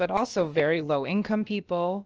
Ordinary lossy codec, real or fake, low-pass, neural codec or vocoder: Opus, 24 kbps; fake; 7.2 kHz; codec, 16 kHz, about 1 kbps, DyCAST, with the encoder's durations